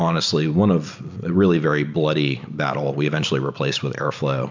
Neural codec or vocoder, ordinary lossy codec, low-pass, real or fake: none; MP3, 64 kbps; 7.2 kHz; real